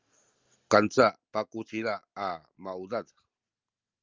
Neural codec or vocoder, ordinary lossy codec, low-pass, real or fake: none; Opus, 32 kbps; 7.2 kHz; real